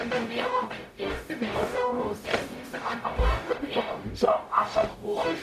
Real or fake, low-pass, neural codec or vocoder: fake; 14.4 kHz; codec, 44.1 kHz, 0.9 kbps, DAC